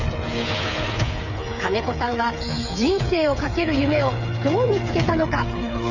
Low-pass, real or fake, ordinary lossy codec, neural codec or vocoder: 7.2 kHz; fake; none; codec, 16 kHz, 8 kbps, FreqCodec, smaller model